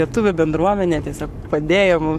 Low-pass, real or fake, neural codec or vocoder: 14.4 kHz; fake; codec, 44.1 kHz, 7.8 kbps, Pupu-Codec